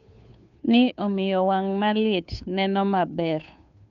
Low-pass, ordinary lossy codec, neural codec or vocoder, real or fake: 7.2 kHz; none; codec, 16 kHz, 2 kbps, FunCodec, trained on Chinese and English, 25 frames a second; fake